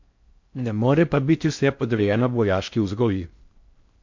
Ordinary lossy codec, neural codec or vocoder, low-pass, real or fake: MP3, 48 kbps; codec, 16 kHz in and 24 kHz out, 0.6 kbps, FocalCodec, streaming, 4096 codes; 7.2 kHz; fake